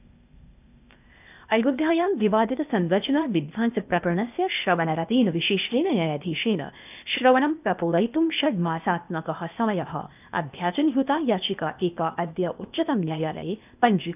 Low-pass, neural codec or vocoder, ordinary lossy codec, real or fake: 3.6 kHz; codec, 16 kHz, 0.8 kbps, ZipCodec; none; fake